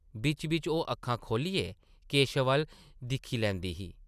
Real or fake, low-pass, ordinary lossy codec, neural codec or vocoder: real; 14.4 kHz; none; none